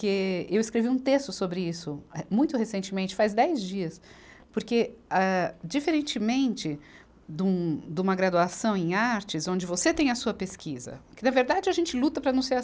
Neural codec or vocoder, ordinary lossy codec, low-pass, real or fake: none; none; none; real